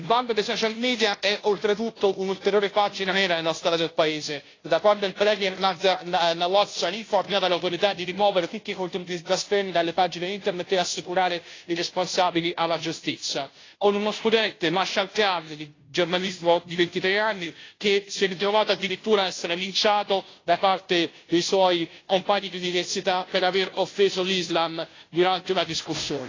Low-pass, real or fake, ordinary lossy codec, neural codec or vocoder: 7.2 kHz; fake; AAC, 32 kbps; codec, 16 kHz, 0.5 kbps, FunCodec, trained on Chinese and English, 25 frames a second